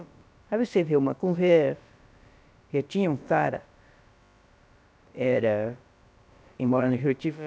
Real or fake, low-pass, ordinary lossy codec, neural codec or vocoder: fake; none; none; codec, 16 kHz, about 1 kbps, DyCAST, with the encoder's durations